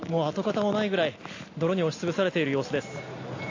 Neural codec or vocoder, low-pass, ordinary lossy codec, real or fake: none; 7.2 kHz; AAC, 32 kbps; real